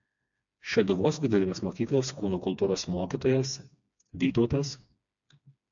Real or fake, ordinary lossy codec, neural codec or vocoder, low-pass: fake; AAC, 64 kbps; codec, 16 kHz, 2 kbps, FreqCodec, smaller model; 7.2 kHz